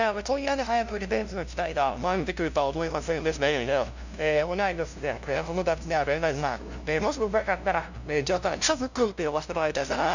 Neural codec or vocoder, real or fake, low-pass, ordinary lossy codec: codec, 16 kHz, 0.5 kbps, FunCodec, trained on LibriTTS, 25 frames a second; fake; 7.2 kHz; none